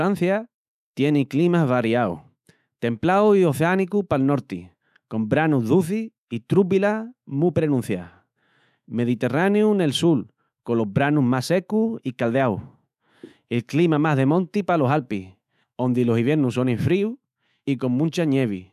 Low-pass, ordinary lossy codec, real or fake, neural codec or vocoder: 14.4 kHz; none; fake; autoencoder, 48 kHz, 128 numbers a frame, DAC-VAE, trained on Japanese speech